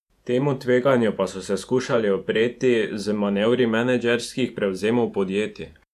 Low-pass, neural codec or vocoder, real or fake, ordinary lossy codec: 14.4 kHz; vocoder, 48 kHz, 128 mel bands, Vocos; fake; none